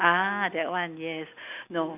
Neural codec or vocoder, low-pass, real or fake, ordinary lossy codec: none; 3.6 kHz; real; none